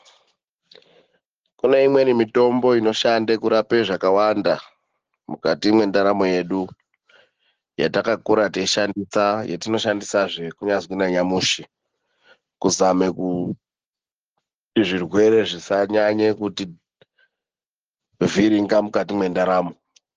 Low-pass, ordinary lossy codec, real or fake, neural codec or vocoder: 7.2 kHz; Opus, 16 kbps; real; none